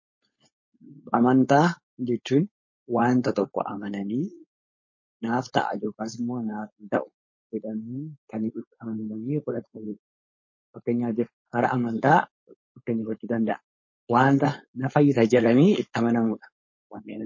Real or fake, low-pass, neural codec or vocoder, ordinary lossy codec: fake; 7.2 kHz; codec, 16 kHz, 4.8 kbps, FACodec; MP3, 32 kbps